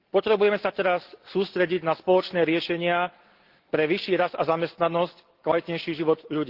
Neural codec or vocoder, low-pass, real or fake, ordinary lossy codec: none; 5.4 kHz; real; Opus, 16 kbps